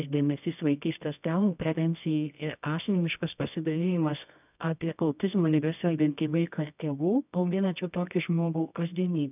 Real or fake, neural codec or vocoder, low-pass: fake; codec, 24 kHz, 0.9 kbps, WavTokenizer, medium music audio release; 3.6 kHz